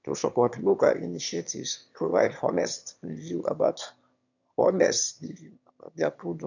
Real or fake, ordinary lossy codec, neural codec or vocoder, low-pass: fake; none; autoencoder, 22.05 kHz, a latent of 192 numbers a frame, VITS, trained on one speaker; 7.2 kHz